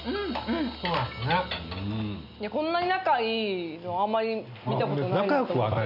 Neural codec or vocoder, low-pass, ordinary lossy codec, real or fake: none; 5.4 kHz; none; real